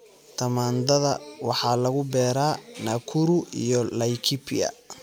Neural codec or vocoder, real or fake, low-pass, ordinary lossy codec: none; real; none; none